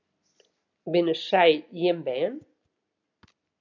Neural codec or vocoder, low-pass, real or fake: none; 7.2 kHz; real